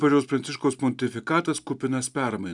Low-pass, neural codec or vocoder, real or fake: 10.8 kHz; none; real